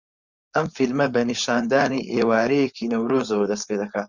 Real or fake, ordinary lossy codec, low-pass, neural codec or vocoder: fake; Opus, 64 kbps; 7.2 kHz; codec, 16 kHz, 4.8 kbps, FACodec